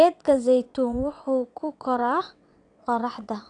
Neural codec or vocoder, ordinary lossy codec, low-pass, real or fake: vocoder, 22.05 kHz, 80 mel bands, WaveNeXt; none; 9.9 kHz; fake